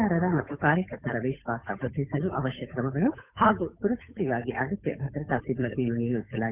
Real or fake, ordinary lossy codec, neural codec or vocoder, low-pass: fake; none; codec, 16 kHz, 8 kbps, FunCodec, trained on Chinese and English, 25 frames a second; 3.6 kHz